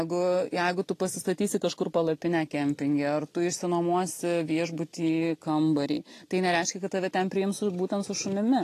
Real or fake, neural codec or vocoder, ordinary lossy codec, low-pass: real; none; AAC, 48 kbps; 14.4 kHz